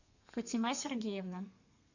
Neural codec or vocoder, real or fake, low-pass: codec, 32 kHz, 1.9 kbps, SNAC; fake; 7.2 kHz